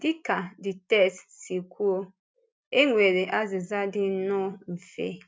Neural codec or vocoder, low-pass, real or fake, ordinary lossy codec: none; none; real; none